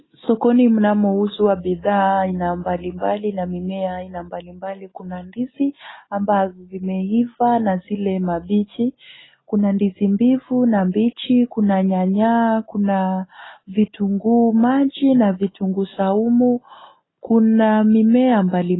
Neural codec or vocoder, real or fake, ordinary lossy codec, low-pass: none; real; AAC, 16 kbps; 7.2 kHz